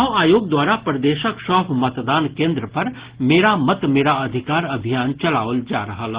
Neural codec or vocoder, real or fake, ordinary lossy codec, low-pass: none; real; Opus, 16 kbps; 3.6 kHz